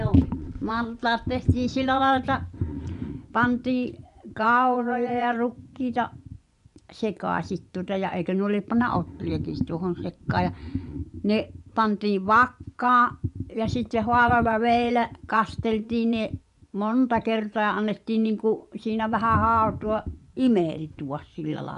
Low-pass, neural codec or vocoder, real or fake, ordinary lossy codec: 10.8 kHz; vocoder, 24 kHz, 100 mel bands, Vocos; fake; none